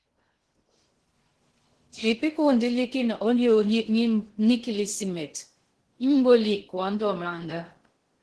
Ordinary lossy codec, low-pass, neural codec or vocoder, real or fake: Opus, 16 kbps; 10.8 kHz; codec, 16 kHz in and 24 kHz out, 0.6 kbps, FocalCodec, streaming, 2048 codes; fake